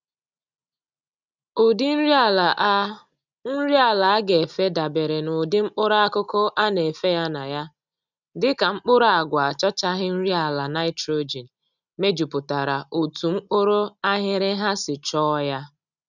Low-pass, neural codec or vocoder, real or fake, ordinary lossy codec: 7.2 kHz; none; real; none